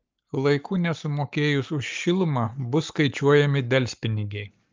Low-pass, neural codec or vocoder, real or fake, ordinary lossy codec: 7.2 kHz; codec, 44.1 kHz, 7.8 kbps, Pupu-Codec; fake; Opus, 24 kbps